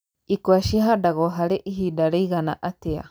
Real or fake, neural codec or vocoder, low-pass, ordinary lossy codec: real; none; none; none